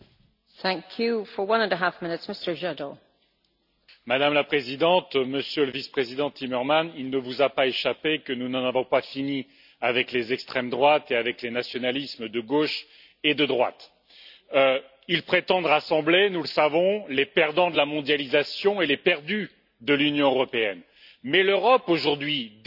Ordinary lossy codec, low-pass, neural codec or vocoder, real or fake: none; 5.4 kHz; none; real